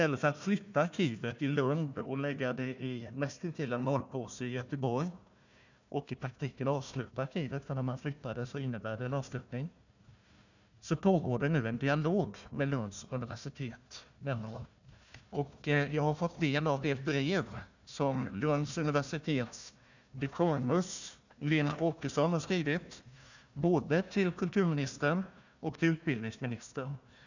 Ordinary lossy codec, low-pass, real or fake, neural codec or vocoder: none; 7.2 kHz; fake; codec, 16 kHz, 1 kbps, FunCodec, trained on Chinese and English, 50 frames a second